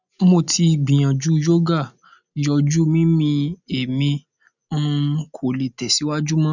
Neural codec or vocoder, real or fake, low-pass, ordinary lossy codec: none; real; 7.2 kHz; none